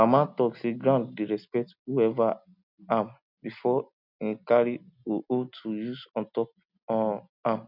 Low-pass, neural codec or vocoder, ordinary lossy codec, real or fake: 5.4 kHz; none; none; real